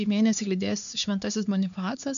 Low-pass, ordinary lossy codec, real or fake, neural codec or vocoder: 7.2 kHz; MP3, 96 kbps; fake; codec, 16 kHz, 4 kbps, X-Codec, HuBERT features, trained on LibriSpeech